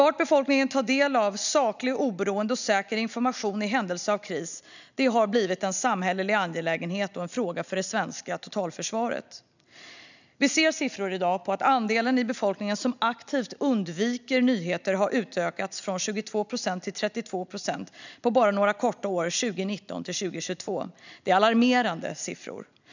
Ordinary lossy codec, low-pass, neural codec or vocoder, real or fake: none; 7.2 kHz; none; real